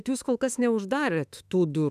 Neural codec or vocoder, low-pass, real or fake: autoencoder, 48 kHz, 32 numbers a frame, DAC-VAE, trained on Japanese speech; 14.4 kHz; fake